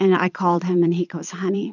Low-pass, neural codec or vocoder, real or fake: 7.2 kHz; vocoder, 22.05 kHz, 80 mel bands, Vocos; fake